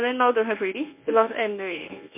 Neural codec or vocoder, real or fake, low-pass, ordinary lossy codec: codec, 24 kHz, 0.9 kbps, WavTokenizer, medium speech release version 1; fake; 3.6 kHz; MP3, 32 kbps